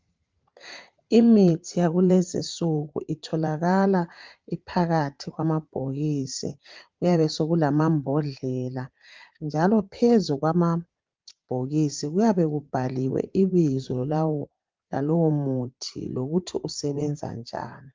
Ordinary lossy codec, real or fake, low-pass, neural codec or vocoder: Opus, 24 kbps; fake; 7.2 kHz; vocoder, 44.1 kHz, 80 mel bands, Vocos